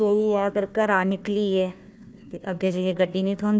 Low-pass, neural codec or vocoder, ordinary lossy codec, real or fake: none; codec, 16 kHz, 1 kbps, FunCodec, trained on Chinese and English, 50 frames a second; none; fake